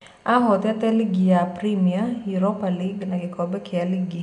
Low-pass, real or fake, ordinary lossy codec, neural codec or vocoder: 10.8 kHz; real; none; none